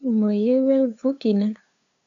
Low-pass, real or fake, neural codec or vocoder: 7.2 kHz; fake; codec, 16 kHz, 2 kbps, FunCodec, trained on LibriTTS, 25 frames a second